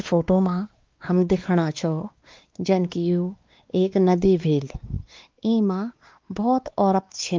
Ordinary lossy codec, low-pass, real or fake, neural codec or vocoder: Opus, 32 kbps; 7.2 kHz; fake; codec, 16 kHz, 2 kbps, X-Codec, WavLM features, trained on Multilingual LibriSpeech